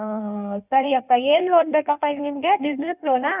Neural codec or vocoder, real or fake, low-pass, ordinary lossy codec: codec, 16 kHz in and 24 kHz out, 1.1 kbps, FireRedTTS-2 codec; fake; 3.6 kHz; none